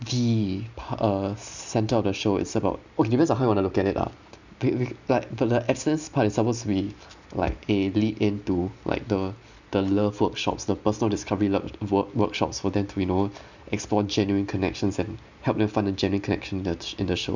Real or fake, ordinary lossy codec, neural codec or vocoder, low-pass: real; none; none; 7.2 kHz